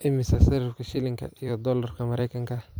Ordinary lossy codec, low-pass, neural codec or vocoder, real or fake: none; none; vocoder, 44.1 kHz, 128 mel bands every 256 samples, BigVGAN v2; fake